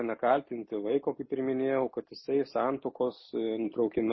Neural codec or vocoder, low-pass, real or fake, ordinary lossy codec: none; 7.2 kHz; real; MP3, 24 kbps